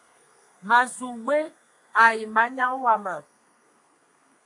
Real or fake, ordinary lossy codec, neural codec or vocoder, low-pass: fake; AAC, 64 kbps; codec, 32 kHz, 1.9 kbps, SNAC; 10.8 kHz